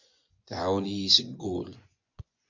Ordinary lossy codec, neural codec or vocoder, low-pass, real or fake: MP3, 48 kbps; vocoder, 24 kHz, 100 mel bands, Vocos; 7.2 kHz; fake